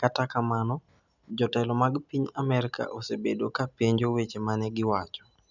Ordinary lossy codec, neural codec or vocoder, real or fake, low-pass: none; none; real; 7.2 kHz